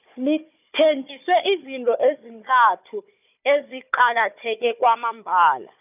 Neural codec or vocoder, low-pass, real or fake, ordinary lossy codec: codec, 16 kHz, 4 kbps, FunCodec, trained on Chinese and English, 50 frames a second; 3.6 kHz; fake; none